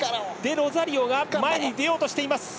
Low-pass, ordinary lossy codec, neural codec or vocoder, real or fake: none; none; none; real